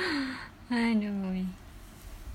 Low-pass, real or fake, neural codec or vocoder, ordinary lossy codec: 19.8 kHz; real; none; none